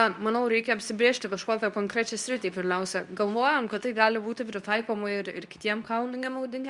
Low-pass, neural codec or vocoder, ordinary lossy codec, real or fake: 10.8 kHz; codec, 24 kHz, 0.9 kbps, WavTokenizer, medium speech release version 2; Opus, 64 kbps; fake